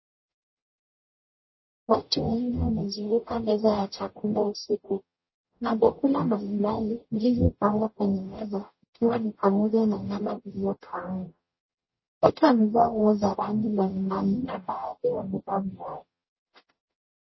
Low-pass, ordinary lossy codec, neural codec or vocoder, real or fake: 7.2 kHz; MP3, 24 kbps; codec, 44.1 kHz, 0.9 kbps, DAC; fake